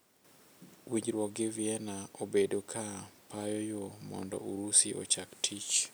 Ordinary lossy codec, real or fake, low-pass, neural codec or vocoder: none; real; none; none